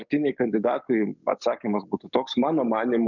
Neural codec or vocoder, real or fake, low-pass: codec, 44.1 kHz, 7.8 kbps, DAC; fake; 7.2 kHz